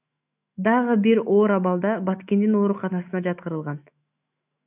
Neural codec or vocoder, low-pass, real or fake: autoencoder, 48 kHz, 128 numbers a frame, DAC-VAE, trained on Japanese speech; 3.6 kHz; fake